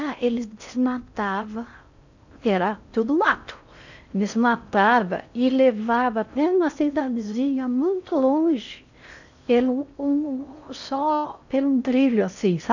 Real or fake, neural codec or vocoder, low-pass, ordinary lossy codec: fake; codec, 16 kHz in and 24 kHz out, 0.6 kbps, FocalCodec, streaming, 4096 codes; 7.2 kHz; none